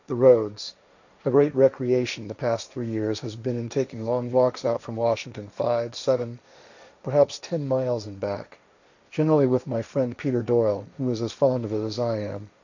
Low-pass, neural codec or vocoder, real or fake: 7.2 kHz; codec, 16 kHz, 1.1 kbps, Voila-Tokenizer; fake